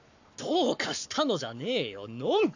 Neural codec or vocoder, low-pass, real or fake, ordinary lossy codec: codec, 44.1 kHz, 7.8 kbps, Pupu-Codec; 7.2 kHz; fake; none